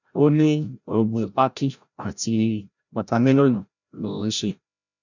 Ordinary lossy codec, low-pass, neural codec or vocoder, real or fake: none; 7.2 kHz; codec, 16 kHz, 0.5 kbps, FreqCodec, larger model; fake